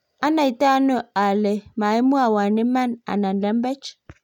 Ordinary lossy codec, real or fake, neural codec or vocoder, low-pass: none; real; none; 19.8 kHz